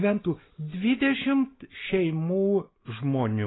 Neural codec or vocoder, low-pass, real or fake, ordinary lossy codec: none; 7.2 kHz; real; AAC, 16 kbps